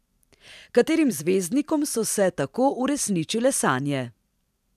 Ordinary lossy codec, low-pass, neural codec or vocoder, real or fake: none; 14.4 kHz; vocoder, 44.1 kHz, 128 mel bands every 512 samples, BigVGAN v2; fake